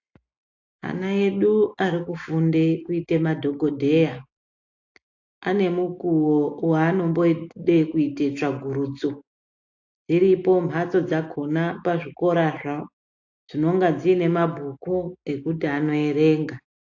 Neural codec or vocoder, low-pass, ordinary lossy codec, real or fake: none; 7.2 kHz; AAC, 48 kbps; real